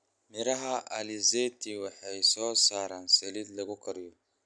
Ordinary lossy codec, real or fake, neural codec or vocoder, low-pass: none; real; none; 9.9 kHz